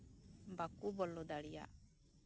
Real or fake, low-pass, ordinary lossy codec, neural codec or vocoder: real; none; none; none